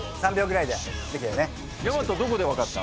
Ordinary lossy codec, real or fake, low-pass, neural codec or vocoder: none; real; none; none